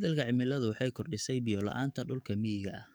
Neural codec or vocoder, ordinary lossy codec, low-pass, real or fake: codec, 44.1 kHz, 7.8 kbps, DAC; none; none; fake